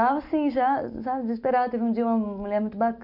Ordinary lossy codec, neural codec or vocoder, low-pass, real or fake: none; none; 5.4 kHz; real